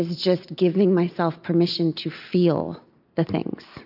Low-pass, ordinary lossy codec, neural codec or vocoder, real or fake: 5.4 kHz; AAC, 48 kbps; none; real